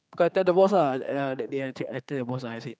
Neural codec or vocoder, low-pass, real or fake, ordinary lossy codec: codec, 16 kHz, 4 kbps, X-Codec, HuBERT features, trained on general audio; none; fake; none